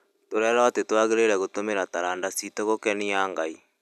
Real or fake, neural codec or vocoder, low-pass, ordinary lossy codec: real; none; 14.4 kHz; none